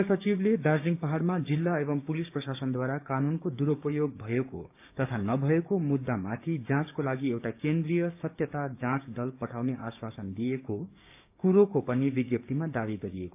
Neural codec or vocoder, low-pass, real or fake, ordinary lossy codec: codec, 16 kHz, 6 kbps, DAC; 3.6 kHz; fake; AAC, 32 kbps